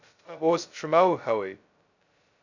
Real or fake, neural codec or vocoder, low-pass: fake; codec, 16 kHz, 0.2 kbps, FocalCodec; 7.2 kHz